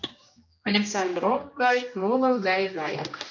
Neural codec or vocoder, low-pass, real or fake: codec, 16 kHz, 1 kbps, X-Codec, HuBERT features, trained on general audio; 7.2 kHz; fake